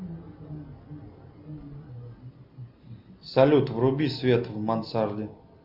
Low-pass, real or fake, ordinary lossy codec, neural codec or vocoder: 5.4 kHz; real; Opus, 64 kbps; none